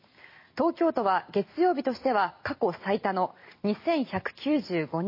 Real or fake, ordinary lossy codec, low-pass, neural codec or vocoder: real; MP3, 24 kbps; 5.4 kHz; none